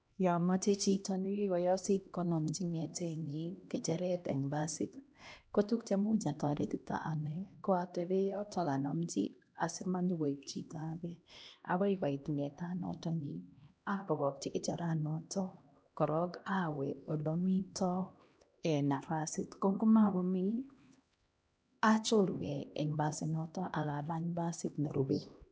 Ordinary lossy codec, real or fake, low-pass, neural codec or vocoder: none; fake; none; codec, 16 kHz, 1 kbps, X-Codec, HuBERT features, trained on LibriSpeech